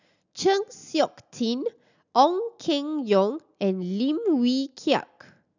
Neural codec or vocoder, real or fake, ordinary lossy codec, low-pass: none; real; none; 7.2 kHz